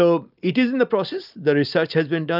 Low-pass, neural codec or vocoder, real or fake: 5.4 kHz; none; real